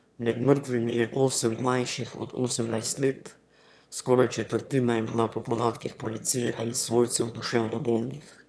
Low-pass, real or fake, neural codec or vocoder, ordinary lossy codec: none; fake; autoencoder, 22.05 kHz, a latent of 192 numbers a frame, VITS, trained on one speaker; none